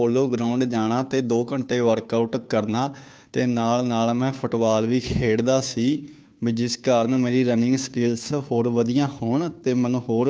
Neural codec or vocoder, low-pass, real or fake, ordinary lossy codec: codec, 16 kHz, 2 kbps, FunCodec, trained on Chinese and English, 25 frames a second; none; fake; none